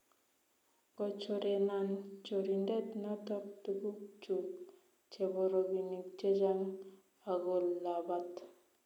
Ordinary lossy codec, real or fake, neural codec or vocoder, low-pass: none; real; none; 19.8 kHz